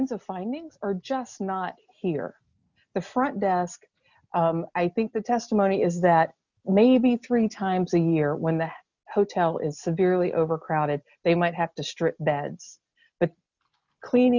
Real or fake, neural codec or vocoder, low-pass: real; none; 7.2 kHz